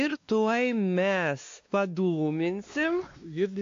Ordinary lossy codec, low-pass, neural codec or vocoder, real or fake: AAC, 48 kbps; 7.2 kHz; codec, 16 kHz, 2 kbps, X-Codec, WavLM features, trained on Multilingual LibriSpeech; fake